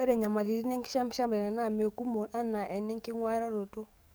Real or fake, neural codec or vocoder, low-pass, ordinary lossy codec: fake; codec, 44.1 kHz, 7.8 kbps, DAC; none; none